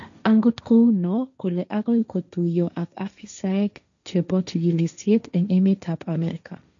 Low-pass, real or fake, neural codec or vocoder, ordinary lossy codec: 7.2 kHz; fake; codec, 16 kHz, 1.1 kbps, Voila-Tokenizer; none